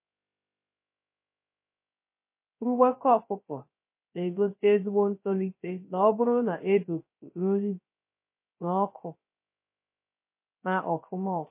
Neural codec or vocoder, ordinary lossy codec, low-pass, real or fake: codec, 16 kHz, 0.3 kbps, FocalCodec; MP3, 24 kbps; 3.6 kHz; fake